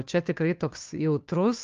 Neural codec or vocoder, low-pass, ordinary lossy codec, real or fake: codec, 16 kHz, 2 kbps, FunCodec, trained on Chinese and English, 25 frames a second; 7.2 kHz; Opus, 32 kbps; fake